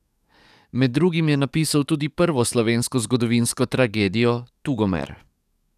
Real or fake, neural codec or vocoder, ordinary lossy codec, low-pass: fake; codec, 44.1 kHz, 7.8 kbps, DAC; none; 14.4 kHz